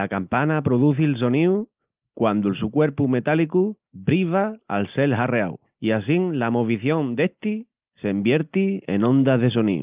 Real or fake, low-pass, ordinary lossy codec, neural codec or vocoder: real; 3.6 kHz; Opus, 32 kbps; none